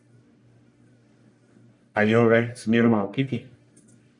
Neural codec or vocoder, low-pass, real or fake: codec, 44.1 kHz, 1.7 kbps, Pupu-Codec; 10.8 kHz; fake